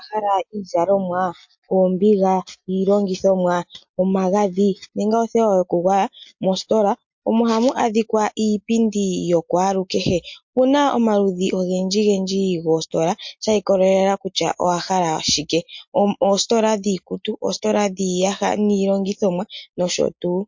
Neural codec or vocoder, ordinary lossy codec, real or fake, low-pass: none; MP3, 48 kbps; real; 7.2 kHz